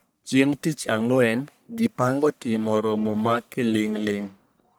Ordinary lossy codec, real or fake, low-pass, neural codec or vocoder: none; fake; none; codec, 44.1 kHz, 1.7 kbps, Pupu-Codec